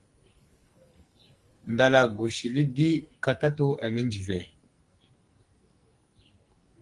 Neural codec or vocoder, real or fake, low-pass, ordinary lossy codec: codec, 44.1 kHz, 2.6 kbps, SNAC; fake; 10.8 kHz; Opus, 32 kbps